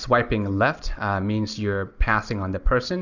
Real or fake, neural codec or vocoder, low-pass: real; none; 7.2 kHz